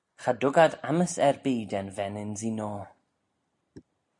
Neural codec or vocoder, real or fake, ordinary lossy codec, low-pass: none; real; MP3, 96 kbps; 10.8 kHz